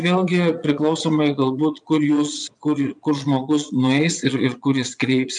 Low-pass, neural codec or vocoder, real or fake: 9.9 kHz; vocoder, 22.05 kHz, 80 mel bands, Vocos; fake